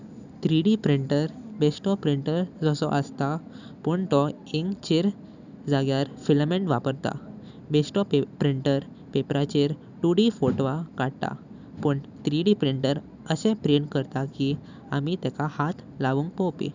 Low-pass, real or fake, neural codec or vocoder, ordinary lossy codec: 7.2 kHz; real; none; none